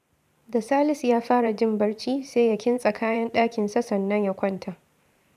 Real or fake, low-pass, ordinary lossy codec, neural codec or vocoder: fake; 14.4 kHz; none; vocoder, 44.1 kHz, 128 mel bands every 512 samples, BigVGAN v2